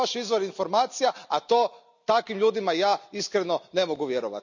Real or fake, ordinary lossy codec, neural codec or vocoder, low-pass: real; none; none; 7.2 kHz